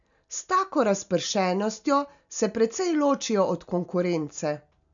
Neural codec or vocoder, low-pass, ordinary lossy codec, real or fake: none; 7.2 kHz; none; real